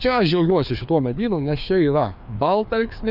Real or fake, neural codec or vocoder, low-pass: fake; codec, 16 kHz, 2 kbps, FreqCodec, larger model; 5.4 kHz